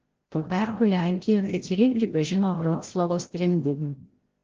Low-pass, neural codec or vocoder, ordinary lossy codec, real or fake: 7.2 kHz; codec, 16 kHz, 0.5 kbps, FreqCodec, larger model; Opus, 16 kbps; fake